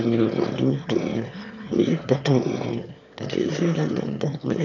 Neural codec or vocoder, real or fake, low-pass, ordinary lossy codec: autoencoder, 22.05 kHz, a latent of 192 numbers a frame, VITS, trained on one speaker; fake; 7.2 kHz; none